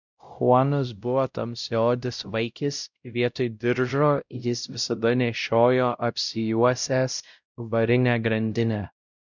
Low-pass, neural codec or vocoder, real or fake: 7.2 kHz; codec, 16 kHz, 0.5 kbps, X-Codec, WavLM features, trained on Multilingual LibriSpeech; fake